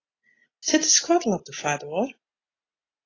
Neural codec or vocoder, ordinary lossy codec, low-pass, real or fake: none; AAC, 32 kbps; 7.2 kHz; real